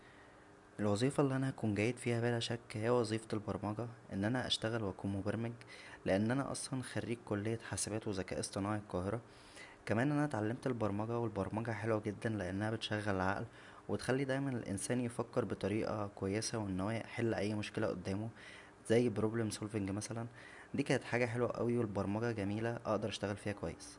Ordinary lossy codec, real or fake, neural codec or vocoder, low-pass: none; real; none; 10.8 kHz